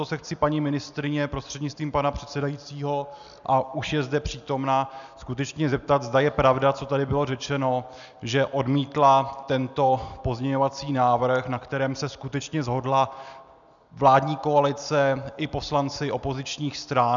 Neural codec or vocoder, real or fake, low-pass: none; real; 7.2 kHz